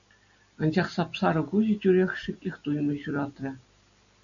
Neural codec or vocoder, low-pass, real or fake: none; 7.2 kHz; real